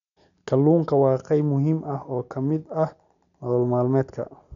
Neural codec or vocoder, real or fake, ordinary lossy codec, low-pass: none; real; none; 7.2 kHz